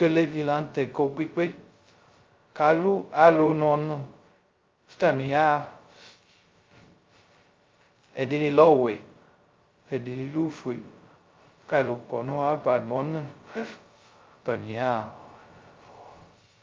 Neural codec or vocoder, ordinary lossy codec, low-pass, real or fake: codec, 16 kHz, 0.2 kbps, FocalCodec; Opus, 24 kbps; 7.2 kHz; fake